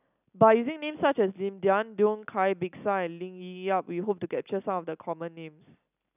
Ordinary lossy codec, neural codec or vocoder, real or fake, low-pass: none; none; real; 3.6 kHz